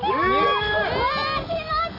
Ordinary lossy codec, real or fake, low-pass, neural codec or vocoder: AAC, 48 kbps; real; 5.4 kHz; none